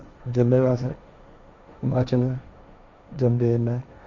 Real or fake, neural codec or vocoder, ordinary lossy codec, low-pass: fake; codec, 16 kHz, 1.1 kbps, Voila-Tokenizer; none; 7.2 kHz